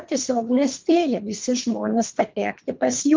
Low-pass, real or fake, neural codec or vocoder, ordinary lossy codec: 7.2 kHz; fake; codec, 24 kHz, 3 kbps, HILCodec; Opus, 32 kbps